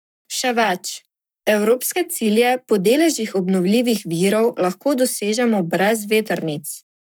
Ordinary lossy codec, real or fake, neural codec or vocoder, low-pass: none; fake; codec, 44.1 kHz, 7.8 kbps, Pupu-Codec; none